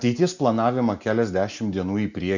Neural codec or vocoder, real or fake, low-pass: none; real; 7.2 kHz